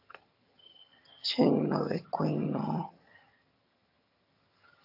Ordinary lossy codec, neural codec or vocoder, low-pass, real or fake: AAC, 48 kbps; vocoder, 22.05 kHz, 80 mel bands, HiFi-GAN; 5.4 kHz; fake